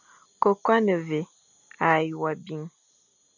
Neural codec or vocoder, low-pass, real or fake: none; 7.2 kHz; real